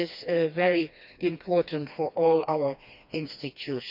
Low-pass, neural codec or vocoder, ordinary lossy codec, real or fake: 5.4 kHz; codec, 16 kHz, 2 kbps, FreqCodec, smaller model; none; fake